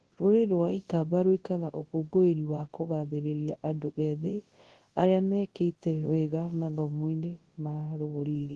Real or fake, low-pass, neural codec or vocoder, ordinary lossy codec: fake; 10.8 kHz; codec, 24 kHz, 0.9 kbps, WavTokenizer, large speech release; Opus, 16 kbps